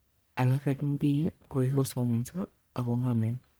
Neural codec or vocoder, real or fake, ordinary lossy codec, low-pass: codec, 44.1 kHz, 1.7 kbps, Pupu-Codec; fake; none; none